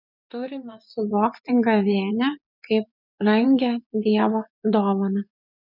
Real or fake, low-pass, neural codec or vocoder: fake; 5.4 kHz; vocoder, 24 kHz, 100 mel bands, Vocos